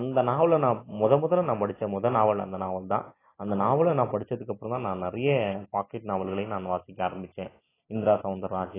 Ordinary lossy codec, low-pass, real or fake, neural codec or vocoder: AAC, 16 kbps; 3.6 kHz; real; none